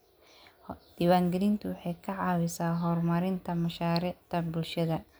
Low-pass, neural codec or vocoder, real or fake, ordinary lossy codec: none; none; real; none